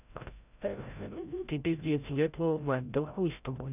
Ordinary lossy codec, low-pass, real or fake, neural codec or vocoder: none; 3.6 kHz; fake; codec, 16 kHz, 0.5 kbps, FreqCodec, larger model